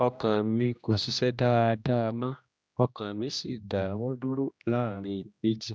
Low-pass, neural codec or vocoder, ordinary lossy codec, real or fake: none; codec, 16 kHz, 1 kbps, X-Codec, HuBERT features, trained on general audio; none; fake